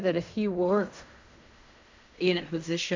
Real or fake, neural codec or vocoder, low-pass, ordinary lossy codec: fake; codec, 16 kHz in and 24 kHz out, 0.4 kbps, LongCat-Audio-Codec, fine tuned four codebook decoder; 7.2 kHz; MP3, 64 kbps